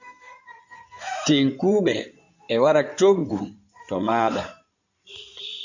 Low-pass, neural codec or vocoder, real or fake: 7.2 kHz; codec, 16 kHz in and 24 kHz out, 2.2 kbps, FireRedTTS-2 codec; fake